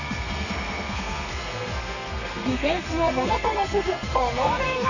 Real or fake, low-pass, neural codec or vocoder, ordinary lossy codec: fake; 7.2 kHz; codec, 32 kHz, 1.9 kbps, SNAC; none